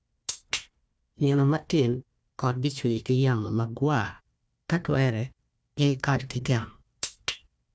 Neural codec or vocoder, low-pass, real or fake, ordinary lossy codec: codec, 16 kHz, 1 kbps, FunCodec, trained on Chinese and English, 50 frames a second; none; fake; none